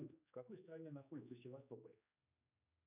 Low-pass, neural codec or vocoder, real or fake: 3.6 kHz; codec, 16 kHz, 2 kbps, X-Codec, HuBERT features, trained on general audio; fake